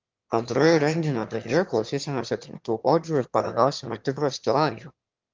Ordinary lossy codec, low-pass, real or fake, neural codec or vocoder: Opus, 32 kbps; 7.2 kHz; fake; autoencoder, 22.05 kHz, a latent of 192 numbers a frame, VITS, trained on one speaker